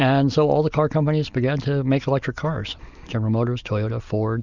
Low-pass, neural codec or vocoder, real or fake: 7.2 kHz; none; real